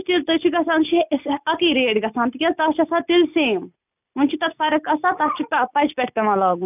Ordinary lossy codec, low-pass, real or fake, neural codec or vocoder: none; 3.6 kHz; real; none